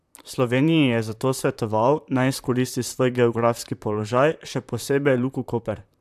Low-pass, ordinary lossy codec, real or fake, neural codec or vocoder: 14.4 kHz; AAC, 96 kbps; fake; vocoder, 44.1 kHz, 128 mel bands, Pupu-Vocoder